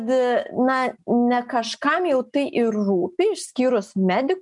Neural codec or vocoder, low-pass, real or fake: none; 10.8 kHz; real